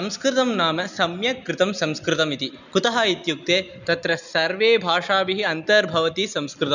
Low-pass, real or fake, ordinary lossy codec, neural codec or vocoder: 7.2 kHz; real; none; none